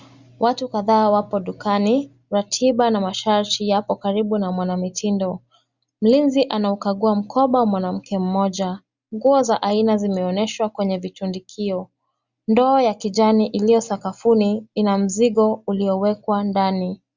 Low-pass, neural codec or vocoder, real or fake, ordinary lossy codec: 7.2 kHz; none; real; Opus, 64 kbps